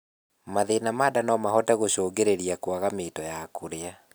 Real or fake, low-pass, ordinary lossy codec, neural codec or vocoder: real; none; none; none